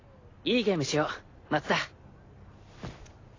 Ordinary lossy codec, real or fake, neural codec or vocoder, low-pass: AAC, 32 kbps; real; none; 7.2 kHz